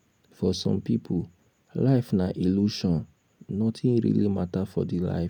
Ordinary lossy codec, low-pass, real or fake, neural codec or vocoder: none; 19.8 kHz; real; none